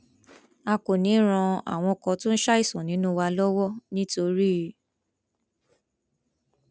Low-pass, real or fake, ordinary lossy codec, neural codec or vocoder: none; real; none; none